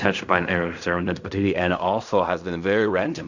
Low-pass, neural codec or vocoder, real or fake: 7.2 kHz; codec, 16 kHz in and 24 kHz out, 0.4 kbps, LongCat-Audio-Codec, fine tuned four codebook decoder; fake